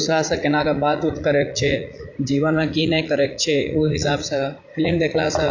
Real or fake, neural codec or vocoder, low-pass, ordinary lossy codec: fake; vocoder, 44.1 kHz, 80 mel bands, Vocos; 7.2 kHz; AAC, 48 kbps